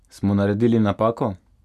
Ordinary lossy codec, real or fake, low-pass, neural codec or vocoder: none; real; 14.4 kHz; none